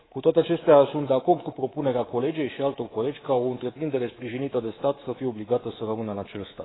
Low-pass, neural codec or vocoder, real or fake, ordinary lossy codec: 7.2 kHz; codec, 24 kHz, 3.1 kbps, DualCodec; fake; AAC, 16 kbps